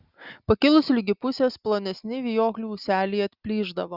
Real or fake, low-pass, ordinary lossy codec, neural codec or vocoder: real; 5.4 kHz; Opus, 64 kbps; none